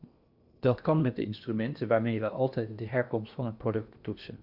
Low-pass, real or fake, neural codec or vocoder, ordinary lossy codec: 5.4 kHz; fake; codec, 16 kHz in and 24 kHz out, 0.8 kbps, FocalCodec, streaming, 65536 codes; Opus, 64 kbps